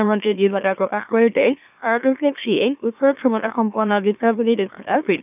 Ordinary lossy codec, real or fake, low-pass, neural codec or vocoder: none; fake; 3.6 kHz; autoencoder, 44.1 kHz, a latent of 192 numbers a frame, MeloTTS